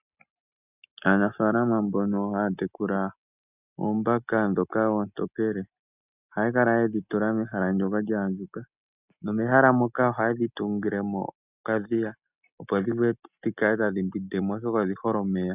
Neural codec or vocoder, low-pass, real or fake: none; 3.6 kHz; real